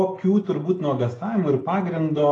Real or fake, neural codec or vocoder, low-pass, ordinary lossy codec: real; none; 10.8 kHz; AAC, 32 kbps